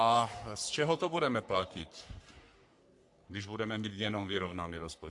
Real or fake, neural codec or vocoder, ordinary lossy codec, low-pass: fake; codec, 44.1 kHz, 3.4 kbps, Pupu-Codec; AAC, 64 kbps; 10.8 kHz